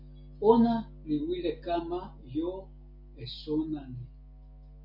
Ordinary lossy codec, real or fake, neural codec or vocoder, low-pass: MP3, 48 kbps; real; none; 5.4 kHz